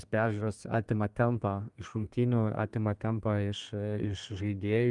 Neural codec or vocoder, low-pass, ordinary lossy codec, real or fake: codec, 32 kHz, 1.9 kbps, SNAC; 10.8 kHz; Opus, 32 kbps; fake